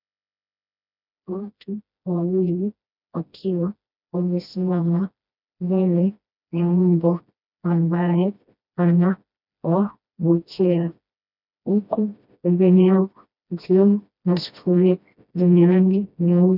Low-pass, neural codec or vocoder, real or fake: 5.4 kHz; codec, 16 kHz, 1 kbps, FreqCodec, smaller model; fake